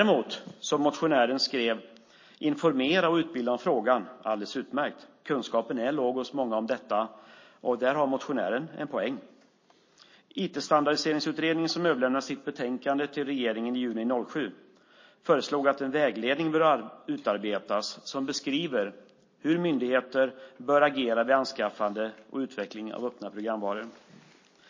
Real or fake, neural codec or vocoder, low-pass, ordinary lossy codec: real; none; 7.2 kHz; MP3, 32 kbps